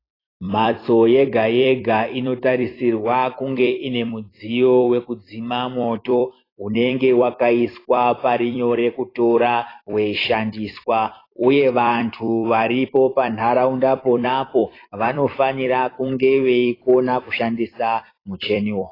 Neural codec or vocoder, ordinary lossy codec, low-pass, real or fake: vocoder, 44.1 kHz, 128 mel bands every 256 samples, BigVGAN v2; AAC, 24 kbps; 5.4 kHz; fake